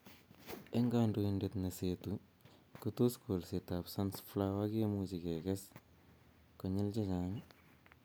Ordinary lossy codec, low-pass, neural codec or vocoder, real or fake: none; none; none; real